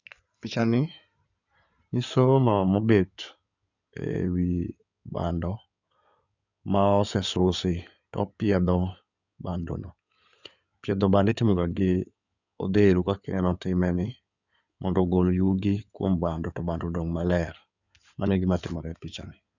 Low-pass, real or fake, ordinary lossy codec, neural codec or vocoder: 7.2 kHz; fake; none; codec, 16 kHz in and 24 kHz out, 2.2 kbps, FireRedTTS-2 codec